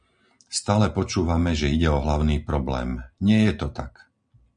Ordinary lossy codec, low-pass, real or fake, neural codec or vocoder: MP3, 96 kbps; 9.9 kHz; real; none